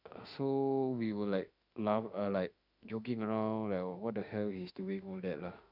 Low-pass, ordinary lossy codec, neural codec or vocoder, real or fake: 5.4 kHz; none; autoencoder, 48 kHz, 32 numbers a frame, DAC-VAE, trained on Japanese speech; fake